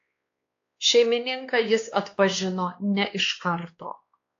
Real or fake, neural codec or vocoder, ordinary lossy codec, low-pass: fake; codec, 16 kHz, 2 kbps, X-Codec, WavLM features, trained on Multilingual LibriSpeech; AAC, 48 kbps; 7.2 kHz